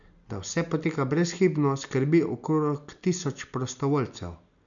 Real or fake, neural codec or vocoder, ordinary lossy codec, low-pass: real; none; none; 7.2 kHz